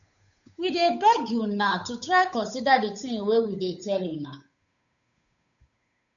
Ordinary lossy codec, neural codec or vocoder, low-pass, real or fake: MP3, 96 kbps; codec, 16 kHz, 8 kbps, FunCodec, trained on Chinese and English, 25 frames a second; 7.2 kHz; fake